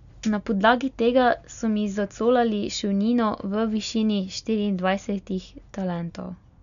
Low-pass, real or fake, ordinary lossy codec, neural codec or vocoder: 7.2 kHz; real; none; none